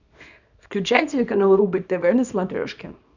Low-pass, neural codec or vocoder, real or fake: 7.2 kHz; codec, 24 kHz, 0.9 kbps, WavTokenizer, small release; fake